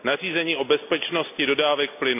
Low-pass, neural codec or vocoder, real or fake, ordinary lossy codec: 3.6 kHz; none; real; none